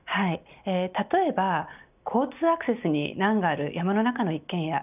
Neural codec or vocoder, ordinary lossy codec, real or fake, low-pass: none; none; real; 3.6 kHz